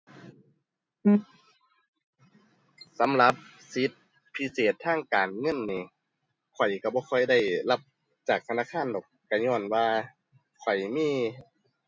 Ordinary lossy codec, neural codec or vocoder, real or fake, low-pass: none; none; real; none